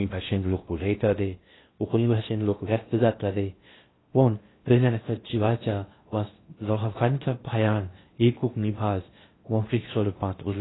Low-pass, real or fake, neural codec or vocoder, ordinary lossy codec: 7.2 kHz; fake; codec, 16 kHz in and 24 kHz out, 0.6 kbps, FocalCodec, streaming, 2048 codes; AAC, 16 kbps